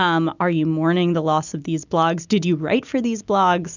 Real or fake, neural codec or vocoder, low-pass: real; none; 7.2 kHz